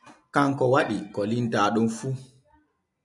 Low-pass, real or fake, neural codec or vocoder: 10.8 kHz; real; none